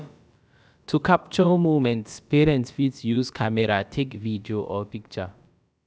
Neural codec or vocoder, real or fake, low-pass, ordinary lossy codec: codec, 16 kHz, about 1 kbps, DyCAST, with the encoder's durations; fake; none; none